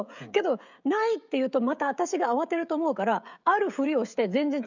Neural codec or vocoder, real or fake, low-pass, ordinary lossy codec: codec, 16 kHz, 16 kbps, FreqCodec, smaller model; fake; 7.2 kHz; none